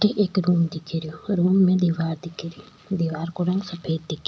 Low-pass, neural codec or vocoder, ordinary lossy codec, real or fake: none; none; none; real